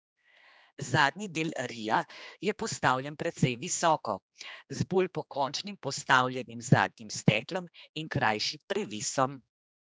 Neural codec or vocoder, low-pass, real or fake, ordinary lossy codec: codec, 16 kHz, 2 kbps, X-Codec, HuBERT features, trained on general audio; none; fake; none